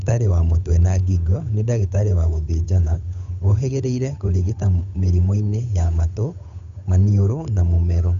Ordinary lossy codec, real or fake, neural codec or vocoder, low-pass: none; fake; codec, 16 kHz, 8 kbps, FunCodec, trained on Chinese and English, 25 frames a second; 7.2 kHz